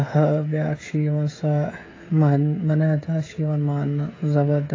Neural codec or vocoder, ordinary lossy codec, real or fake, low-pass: codec, 16 kHz, 16 kbps, FreqCodec, smaller model; AAC, 32 kbps; fake; 7.2 kHz